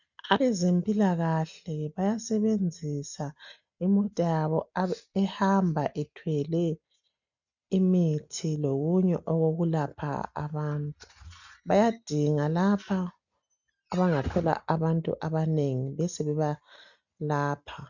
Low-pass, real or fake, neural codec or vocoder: 7.2 kHz; real; none